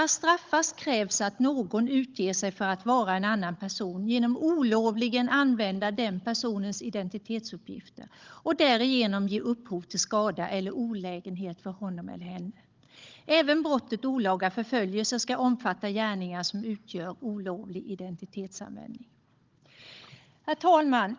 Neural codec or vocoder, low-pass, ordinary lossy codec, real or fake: codec, 16 kHz, 16 kbps, FunCodec, trained on Chinese and English, 50 frames a second; 7.2 kHz; Opus, 32 kbps; fake